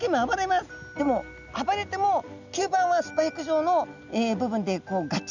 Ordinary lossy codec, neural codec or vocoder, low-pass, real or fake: none; none; 7.2 kHz; real